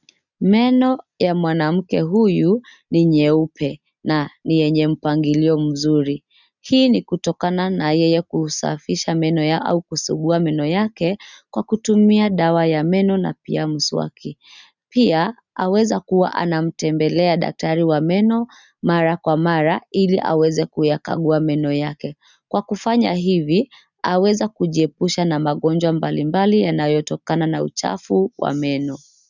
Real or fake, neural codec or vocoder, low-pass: real; none; 7.2 kHz